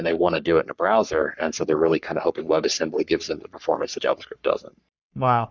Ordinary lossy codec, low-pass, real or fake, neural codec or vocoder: Opus, 64 kbps; 7.2 kHz; fake; codec, 44.1 kHz, 3.4 kbps, Pupu-Codec